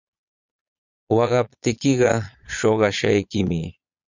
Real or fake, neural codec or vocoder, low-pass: fake; vocoder, 22.05 kHz, 80 mel bands, Vocos; 7.2 kHz